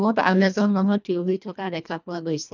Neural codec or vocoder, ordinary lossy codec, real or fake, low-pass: codec, 24 kHz, 1.5 kbps, HILCodec; none; fake; 7.2 kHz